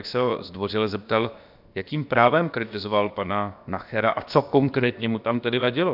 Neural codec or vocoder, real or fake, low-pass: codec, 16 kHz, about 1 kbps, DyCAST, with the encoder's durations; fake; 5.4 kHz